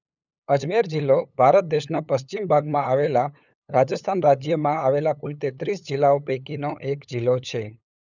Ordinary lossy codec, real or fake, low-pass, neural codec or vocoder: none; fake; 7.2 kHz; codec, 16 kHz, 8 kbps, FunCodec, trained on LibriTTS, 25 frames a second